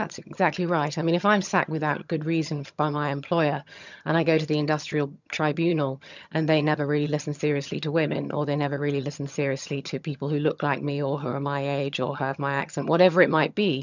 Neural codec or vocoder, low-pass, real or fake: vocoder, 22.05 kHz, 80 mel bands, HiFi-GAN; 7.2 kHz; fake